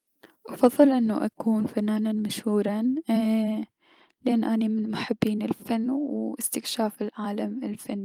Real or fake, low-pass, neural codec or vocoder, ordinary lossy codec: fake; 19.8 kHz; vocoder, 44.1 kHz, 128 mel bands every 256 samples, BigVGAN v2; Opus, 32 kbps